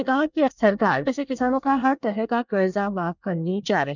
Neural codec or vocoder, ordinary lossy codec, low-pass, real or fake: codec, 24 kHz, 1 kbps, SNAC; none; 7.2 kHz; fake